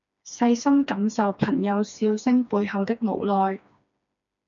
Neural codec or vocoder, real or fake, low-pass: codec, 16 kHz, 2 kbps, FreqCodec, smaller model; fake; 7.2 kHz